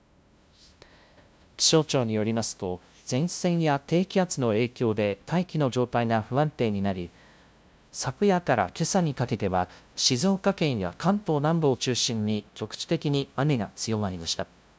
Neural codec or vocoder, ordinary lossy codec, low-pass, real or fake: codec, 16 kHz, 0.5 kbps, FunCodec, trained on LibriTTS, 25 frames a second; none; none; fake